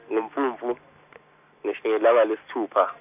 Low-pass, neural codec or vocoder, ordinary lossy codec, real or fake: 3.6 kHz; none; none; real